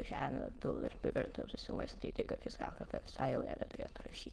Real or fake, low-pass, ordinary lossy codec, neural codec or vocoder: fake; 9.9 kHz; Opus, 16 kbps; autoencoder, 22.05 kHz, a latent of 192 numbers a frame, VITS, trained on many speakers